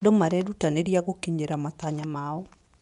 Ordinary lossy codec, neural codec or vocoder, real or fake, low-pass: MP3, 96 kbps; vocoder, 24 kHz, 100 mel bands, Vocos; fake; 10.8 kHz